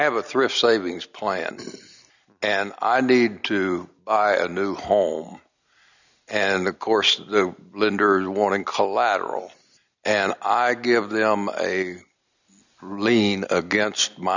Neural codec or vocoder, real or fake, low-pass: none; real; 7.2 kHz